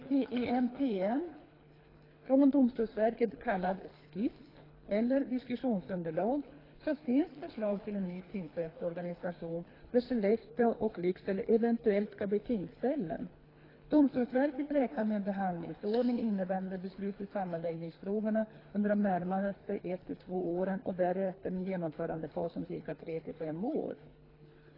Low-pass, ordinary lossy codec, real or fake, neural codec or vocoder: 5.4 kHz; AAC, 24 kbps; fake; codec, 24 kHz, 3 kbps, HILCodec